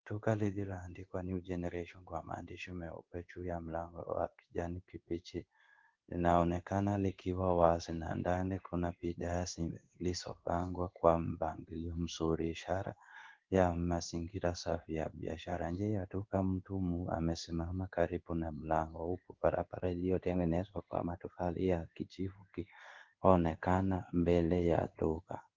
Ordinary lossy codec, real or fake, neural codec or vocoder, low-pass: Opus, 16 kbps; fake; codec, 16 kHz in and 24 kHz out, 1 kbps, XY-Tokenizer; 7.2 kHz